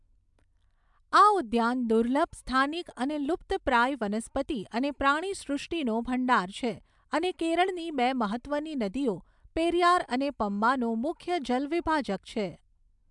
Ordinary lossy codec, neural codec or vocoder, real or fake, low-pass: MP3, 96 kbps; none; real; 10.8 kHz